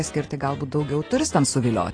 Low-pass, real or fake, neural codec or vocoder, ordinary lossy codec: 9.9 kHz; real; none; AAC, 32 kbps